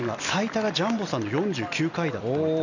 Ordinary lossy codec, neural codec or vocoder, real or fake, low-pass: none; none; real; 7.2 kHz